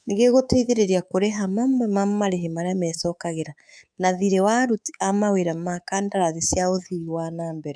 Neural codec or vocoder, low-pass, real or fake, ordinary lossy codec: codec, 24 kHz, 3.1 kbps, DualCodec; 9.9 kHz; fake; none